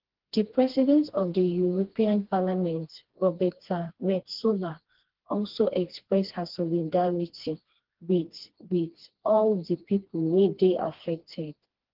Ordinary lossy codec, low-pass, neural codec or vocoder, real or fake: Opus, 16 kbps; 5.4 kHz; codec, 16 kHz, 2 kbps, FreqCodec, smaller model; fake